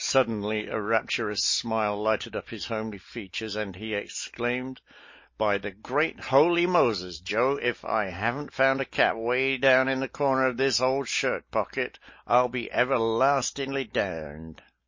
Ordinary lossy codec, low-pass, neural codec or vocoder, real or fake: MP3, 32 kbps; 7.2 kHz; none; real